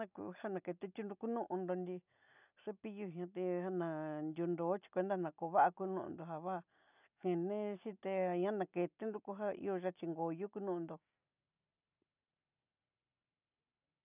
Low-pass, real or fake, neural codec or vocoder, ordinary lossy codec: 3.6 kHz; real; none; none